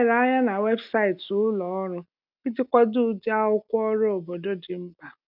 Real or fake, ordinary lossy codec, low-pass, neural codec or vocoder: real; none; 5.4 kHz; none